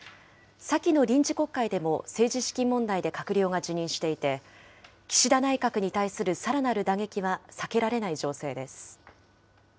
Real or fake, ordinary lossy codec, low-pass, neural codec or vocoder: real; none; none; none